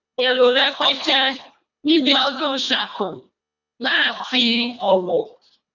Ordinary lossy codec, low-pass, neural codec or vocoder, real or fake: none; 7.2 kHz; codec, 24 kHz, 1.5 kbps, HILCodec; fake